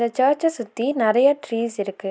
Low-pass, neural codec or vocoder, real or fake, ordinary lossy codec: none; none; real; none